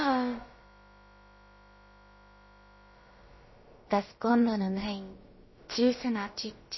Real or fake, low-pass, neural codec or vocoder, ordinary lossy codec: fake; 7.2 kHz; codec, 16 kHz, about 1 kbps, DyCAST, with the encoder's durations; MP3, 24 kbps